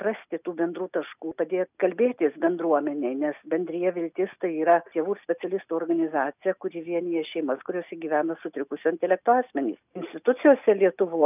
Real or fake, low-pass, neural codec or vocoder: real; 3.6 kHz; none